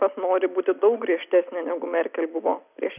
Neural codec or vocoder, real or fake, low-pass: none; real; 3.6 kHz